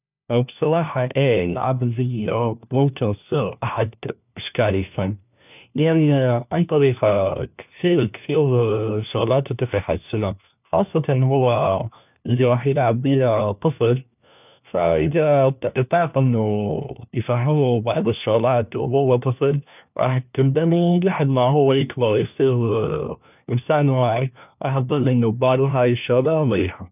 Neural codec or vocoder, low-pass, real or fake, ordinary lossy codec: codec, 16 kHz, 1 kbps, FunCodec, trained on LibriTTS, 50 frames a second; 3.6 kHz; fake; none